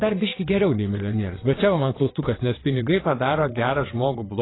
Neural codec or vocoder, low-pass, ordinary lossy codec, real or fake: vocoder, 22.05 kHz, 80 mel bands, WaveNeXt; 7.2 kHz; AAC, 16 kbps; fake